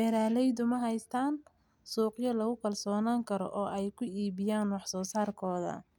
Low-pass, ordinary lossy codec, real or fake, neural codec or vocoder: 19.8 kHz; none; real; none